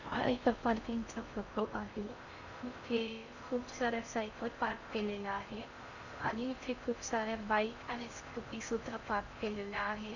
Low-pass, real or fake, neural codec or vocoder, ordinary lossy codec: 7.2 kHz; fake; codec, 16 kHz in and 24 kHz out, 0.6 kbps, FocalCodec, streaming, 4096 codes; none